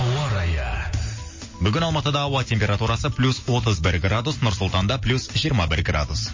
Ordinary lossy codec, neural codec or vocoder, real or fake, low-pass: MP3, 32 kbps; none; real; 7.2 kHz